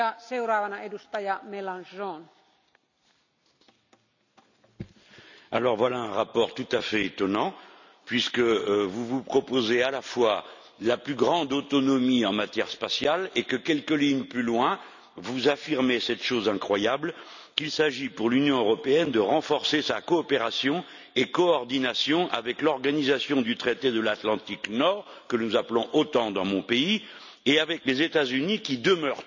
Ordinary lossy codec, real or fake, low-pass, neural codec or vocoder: none; real; 7.2 kHz; none